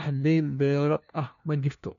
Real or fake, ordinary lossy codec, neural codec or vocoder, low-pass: fake; none; codec, 16 kHz, 1 kbps, FunCodec, trained on LibriTTS, 50 frames a second; 7.2 kHz